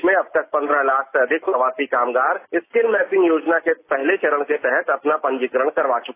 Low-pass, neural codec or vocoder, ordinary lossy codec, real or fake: 3.6 kHz; none; AAC, 24 kbps; real